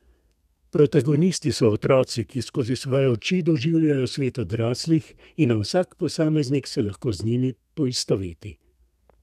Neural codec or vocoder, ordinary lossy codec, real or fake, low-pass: codec, 32 kHz, 1.9 kbps, SNAC; none; fake; 14.4 kHz